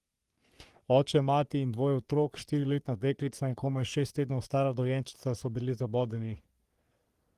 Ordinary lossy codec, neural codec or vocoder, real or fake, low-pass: Opus, 24 kbps; codec, 44.1 kHz, 3.4 kbps, Pupu-Codec; fake; 14.4 kHz